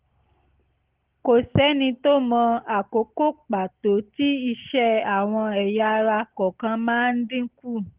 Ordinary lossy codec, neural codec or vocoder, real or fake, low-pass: Opus, 16 kbps; none; real; 3.6 kHz